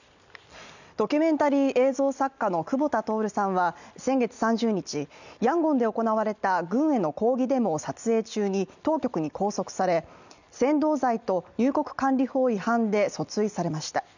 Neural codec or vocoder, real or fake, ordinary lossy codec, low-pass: none; real; none; 7.2 kHz